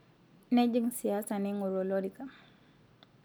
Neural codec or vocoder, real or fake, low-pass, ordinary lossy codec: none; real; none; none